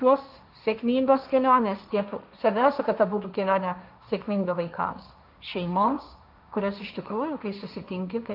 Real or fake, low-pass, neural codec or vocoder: fake; 5.4 kHz; codec, 16 kHz, 1.1 kbps, Voila-Tokenizer